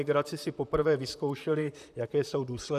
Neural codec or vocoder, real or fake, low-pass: vocoder, 44.1 kHz, 128 mel bands, Pupu-Vocoder; fake; 14.4 kHz